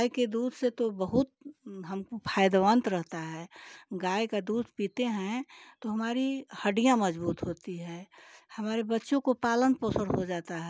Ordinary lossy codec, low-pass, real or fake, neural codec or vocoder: none; none; real; none